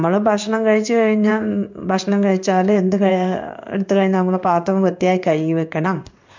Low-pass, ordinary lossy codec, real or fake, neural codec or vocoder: 7.2 kHz; MP3, 64 kbps; fake; codec, 16 kHz in and 24 kHz out, 1 kbps, XY-Tokenizer